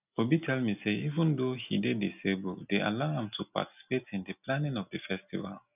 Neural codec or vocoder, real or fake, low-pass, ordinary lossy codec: none; real; 3.6 kHz; none